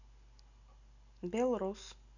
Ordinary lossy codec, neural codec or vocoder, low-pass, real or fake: none; none; 7.2 kHz; real